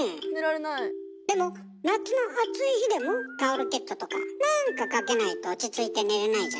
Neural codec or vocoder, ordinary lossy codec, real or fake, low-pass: none; none; real; none